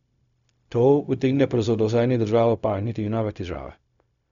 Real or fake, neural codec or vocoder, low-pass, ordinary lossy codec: fake; codec, 16 kHz, 0.4 kbps, LongCat-Audio-Codec; 7.2 kHz; none